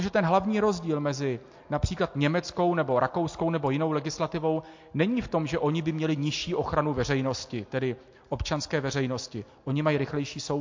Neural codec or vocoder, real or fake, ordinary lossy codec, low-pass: none; real; MP3, 48 kbps; 7.2 kHz